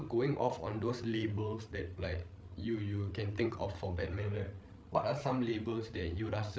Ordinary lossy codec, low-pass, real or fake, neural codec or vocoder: none; none; fake; codec, 16 kHz, 8 kbps, FreqCodec, larger model